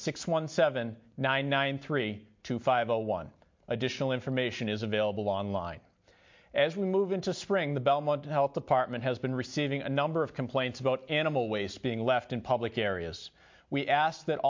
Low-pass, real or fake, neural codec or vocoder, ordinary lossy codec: 7.2 kHz; real; none; MP3, 48 kbps